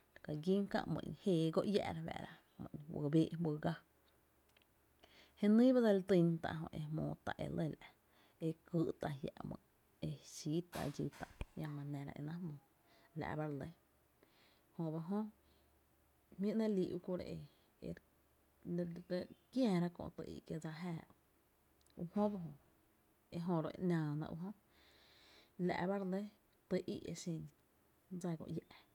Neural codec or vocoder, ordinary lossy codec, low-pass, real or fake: none; none; 19.8 kHz; real